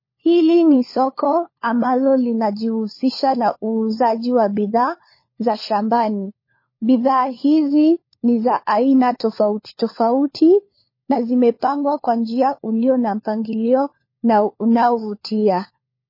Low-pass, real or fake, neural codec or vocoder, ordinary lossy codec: 5.4 kHz; fake; codec, 16 kHz, 4 kbps, FunCodec, trained on LibriTTS, 50 frames a second; MP3, 24 kbps